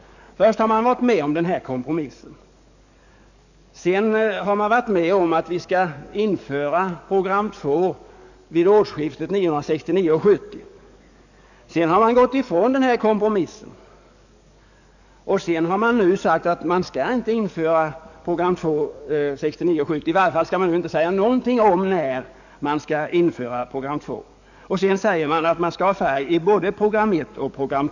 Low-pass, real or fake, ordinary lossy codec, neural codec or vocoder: 7.2 kHz; fake; none; codec, 44.1 kHz, 7.8 kbps, DAC